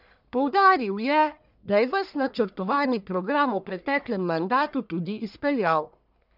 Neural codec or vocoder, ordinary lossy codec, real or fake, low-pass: codec, 44.1 kHz, 1.7 kbps, Pupu-Codec; none; fake; 5.4 kHz